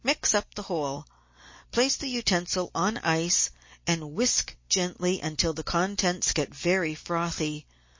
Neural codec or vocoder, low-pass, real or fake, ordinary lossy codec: none; 7.2 kHz; real; MP3, 32 kbps